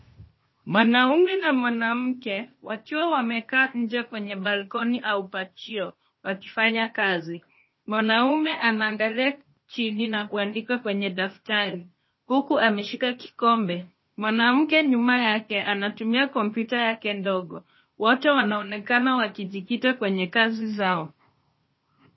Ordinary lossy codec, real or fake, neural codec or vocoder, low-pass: MP3, 24 kbps; fake; codec, 16 kHz, 0.8 kbps, ZipCodec; 7.2 kHz